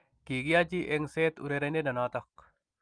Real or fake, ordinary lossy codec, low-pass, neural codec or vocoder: real; Opus, 32 kbps; 9.9 kHz; none